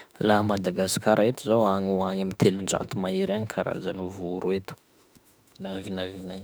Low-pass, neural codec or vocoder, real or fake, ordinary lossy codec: none; autoencoder, 48 kHz, 32 numbers a frame, DAC-VAE, trained on Japanese speech; fake; none